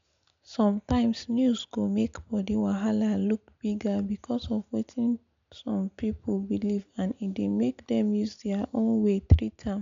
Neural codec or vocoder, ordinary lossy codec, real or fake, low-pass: none; MP3, 64 kbps; real; 7.2 kHz